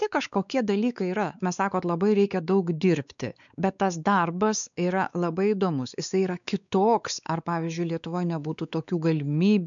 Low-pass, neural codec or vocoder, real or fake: 7.2 kHz; codec, 16 kHz, 4 kbps, X-Codec, WavLM features, trained on Multilingual LibriSpeech; fake